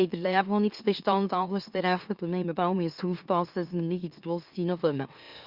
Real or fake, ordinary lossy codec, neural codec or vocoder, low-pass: fake; Opus, 64 kbps; autoencoder, 44.1 kHz, a latent of 192 numbers a frame, MeloTTS; 5.4 kHz